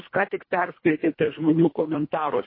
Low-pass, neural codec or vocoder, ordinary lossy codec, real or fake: 5.4 kHz; codec, 24 kHz, 1.5 kbps, HILCodec; MP3, 24 kbps; fake